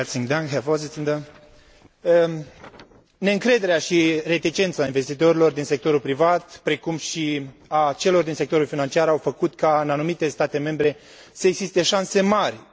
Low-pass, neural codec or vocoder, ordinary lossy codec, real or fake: none; none; none; real